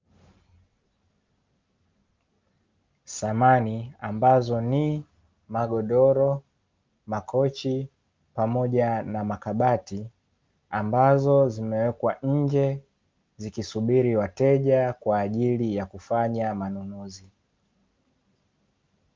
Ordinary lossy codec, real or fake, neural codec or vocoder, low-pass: Opus, 24 kbps; real; none; 7.2 kHz